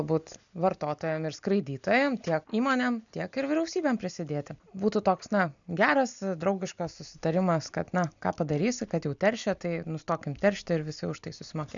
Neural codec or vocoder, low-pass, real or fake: none; 7.2 kHz; real